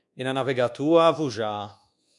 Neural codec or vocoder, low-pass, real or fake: codec, 24 kHz, 1.2 kbps, DualCodec; 10.8 kHz; fake